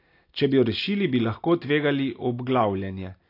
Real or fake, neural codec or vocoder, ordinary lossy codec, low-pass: real; none; AAC, 32 kbps; 5.4 kHz